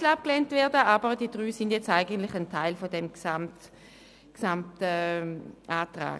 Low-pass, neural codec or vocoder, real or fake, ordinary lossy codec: none; none; real; none